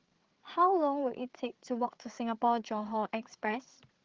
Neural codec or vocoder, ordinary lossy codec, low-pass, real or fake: codec, 16 kHz, 8 kbps, FreqCodec, larger model; Opus, 16 kbps; 7.2 kHz; fake